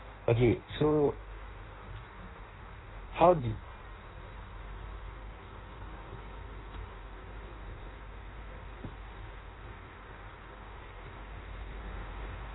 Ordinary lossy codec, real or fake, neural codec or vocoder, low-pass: AAC, 16 kbps; fake; codec, 16 kHz in and 24 kHz out, 1.1 kbps, FireRedTTS-2 codec; 7.2 kHz